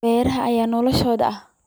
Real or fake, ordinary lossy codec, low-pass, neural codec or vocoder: real; none; none; none